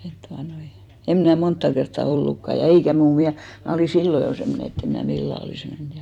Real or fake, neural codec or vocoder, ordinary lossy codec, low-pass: fake; vocoder, 44.1 kHz, 128 mel bands every 256 samples, BigVGAN v2; none; 19.8 kHz